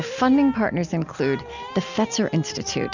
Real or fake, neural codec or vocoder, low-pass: real; none; 7.2 kHz